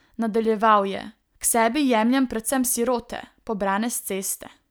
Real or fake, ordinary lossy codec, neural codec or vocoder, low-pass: real; none; none; none